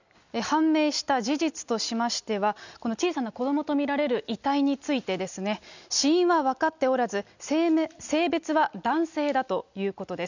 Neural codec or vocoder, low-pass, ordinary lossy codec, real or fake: none; 7.2 kHz; none; real